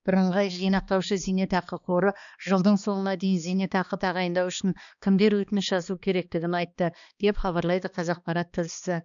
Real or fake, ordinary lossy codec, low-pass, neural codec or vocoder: fake; none; 7.2 kHz; codec, 16 kHz, 2 kbps, X-Codec, HuBERT features, trained on balanced general audio